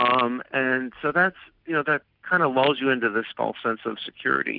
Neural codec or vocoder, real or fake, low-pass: none; real; 5.4 kHz